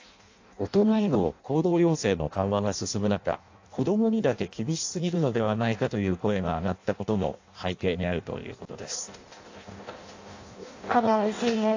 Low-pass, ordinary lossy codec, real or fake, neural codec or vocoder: 7.2 kHz; AAC, 48 kbps; fake; codec, 16 kHz in and 24 kHz out, 0.6 kbps, FireRedTTS-2 codec